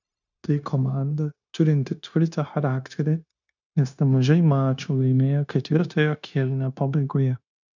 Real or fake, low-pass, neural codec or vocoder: fake; 7.2 kHz; codec, 16 kHz, 0.9 kbps, LongCat-Audio-Codec